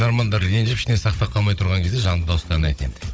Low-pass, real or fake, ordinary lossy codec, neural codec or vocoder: none; fake; none; codec, 16 kHz, 16 kbps, FunCodec, trained on Chinese and English, 50 frames a second